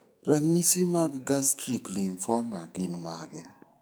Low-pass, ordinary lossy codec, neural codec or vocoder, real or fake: none; none; codec, 44.1 kHz, 2.6 kbps, SNAC; fake